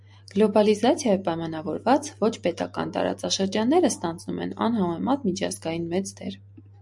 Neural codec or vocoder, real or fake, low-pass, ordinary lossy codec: none; real; 10.8 kHz; MP3, 64 kbps